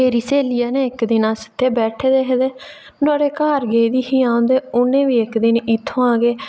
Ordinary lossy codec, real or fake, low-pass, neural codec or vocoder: none; real; none; none